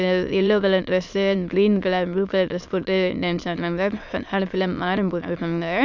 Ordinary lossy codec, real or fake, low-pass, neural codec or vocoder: none; fake; 7.2 kHz; autoencoder, 22.05 kHz, a latent of 192 numbers a frame, VITS, trained on many speakers